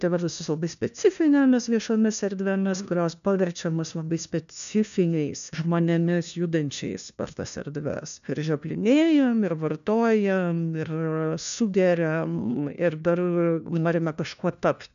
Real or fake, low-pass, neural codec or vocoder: fake; 7.2 kHz; codec, 16 kHz, 1 kbps, FunCodec, trained on LibriTTS, 50 frames a second